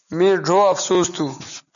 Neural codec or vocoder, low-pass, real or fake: none; 7.2 kHz; real